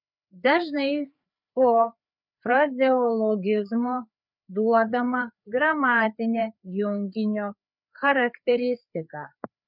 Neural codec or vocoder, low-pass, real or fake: codec, 16 kHz, 4 kbps, FreqCodec, larger model; 5.4 kHz; fake